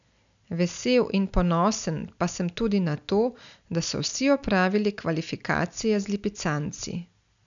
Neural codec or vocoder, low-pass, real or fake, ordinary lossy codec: none; 7.2 kHz; real; none